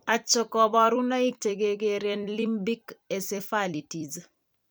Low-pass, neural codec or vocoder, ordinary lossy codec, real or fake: none; vocoder, 44.1 kHz, 128 mel bands every 256 samples, BigVGAN v2; none; fake